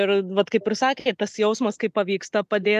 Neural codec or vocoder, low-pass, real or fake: none; 14.4 kHz; real